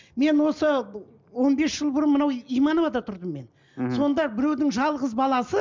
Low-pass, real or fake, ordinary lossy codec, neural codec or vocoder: 7.2 kHz; real; MP3, 64 kbps; none